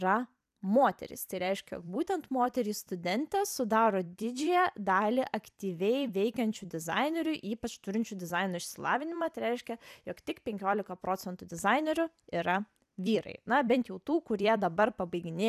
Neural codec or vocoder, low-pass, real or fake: vocoder, 44.1 kHz, 128 mel bands every 256 samples, BigVGAN v2; 14.4 kHz; fake